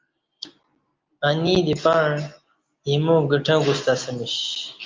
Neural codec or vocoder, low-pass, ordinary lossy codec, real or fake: none; 7.2 kHz; Opus, 24 kbps; real